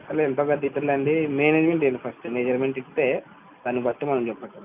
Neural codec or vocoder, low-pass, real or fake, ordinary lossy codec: none; 3.6 kHz; real; none